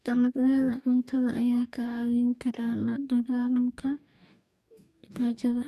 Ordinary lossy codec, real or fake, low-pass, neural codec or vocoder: none; fake; 14.4 kHz; codec, 44.1 kHz, 2.6 kbps, DAC